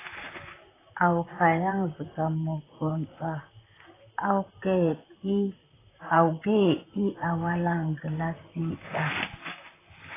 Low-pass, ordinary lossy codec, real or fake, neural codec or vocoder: 3.6 kHz; AAC, 16 kbps; fake; vocoder, 44.1 kHz, 128 mel bands, Pupu-Vocoder